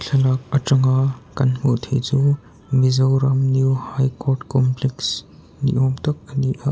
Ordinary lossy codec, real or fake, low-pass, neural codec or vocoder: none; real; none; none